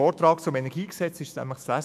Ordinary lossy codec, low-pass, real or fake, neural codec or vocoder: none; 14.4 kHz; fake; autoencoder, 48 kHz, 128 numbers a frame, DAC-VAE, trained on Japanese speech